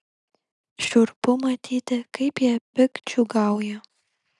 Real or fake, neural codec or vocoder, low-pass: real; none; 10.8 kHz